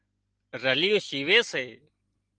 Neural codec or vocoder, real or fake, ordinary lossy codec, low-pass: none; real; Opus, 24 kbps; 9.9 kHz